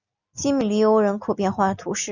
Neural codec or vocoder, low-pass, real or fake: none; 7.2 kHz; real